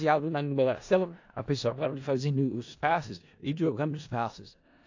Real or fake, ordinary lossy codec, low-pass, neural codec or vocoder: fake; AAC, 48 kbps; 7.2 kHz; codec, 16 kHz in and 24 kHz out, 0.4 kbps, LongCat-Audio-Codec, four codebook decoder